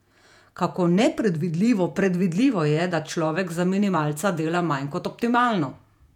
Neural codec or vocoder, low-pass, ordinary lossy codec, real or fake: vocoder, 48 kHz, 128 mel bands, Vocos; 19.8 kHz; none; fake